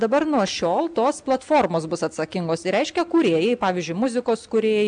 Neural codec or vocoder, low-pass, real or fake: none; 9.9 kHz; real